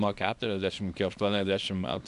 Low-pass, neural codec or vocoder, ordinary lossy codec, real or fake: 10.8 kHz; codec, 24 kHz, 0.9 kbps, WavTokenizer, small release; MP3, 96 kbps; fake